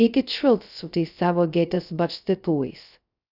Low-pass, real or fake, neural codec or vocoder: 5.4 kHz; fake; codec, 16 kHz, 0.2 kbps, FocalCodec